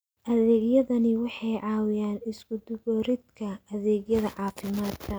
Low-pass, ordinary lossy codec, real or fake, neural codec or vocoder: none; none; real; none